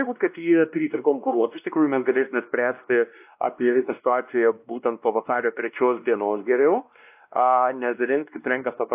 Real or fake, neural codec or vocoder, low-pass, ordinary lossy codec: fake; codec, 16 kHz, 1 kbps, X-Codec, WavLM features, trained on Multilingual LibriSpeech; 3.6 kHz; AAC, 32 kbps